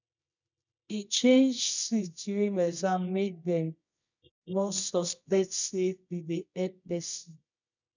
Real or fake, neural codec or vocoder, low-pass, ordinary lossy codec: fake; codec, 24 kHz, 0.9 kbps, WavTokenizer, medium music audio release; 7.2 kHz; none